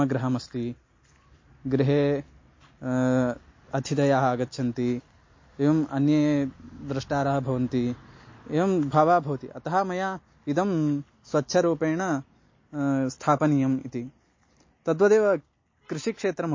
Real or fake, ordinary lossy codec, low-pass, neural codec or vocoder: real; MP3, 32 kbps; 7.2 kHz; none